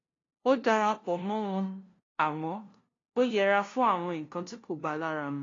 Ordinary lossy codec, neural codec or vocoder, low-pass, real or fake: AAC, 32 kbps; codec, 16 kHz, 0.5 kbps, FunCodec, trained on LibriTTS, 25 frames a second; 7.2 kHz; fake